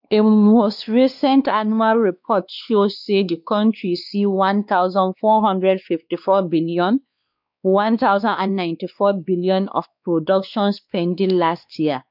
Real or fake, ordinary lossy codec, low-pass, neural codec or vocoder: fake; none; 5.4 kHz; codec, 16 kHz, 2 kbps, X-Codec, WavLM features, trained on Multilingual LibriSpeech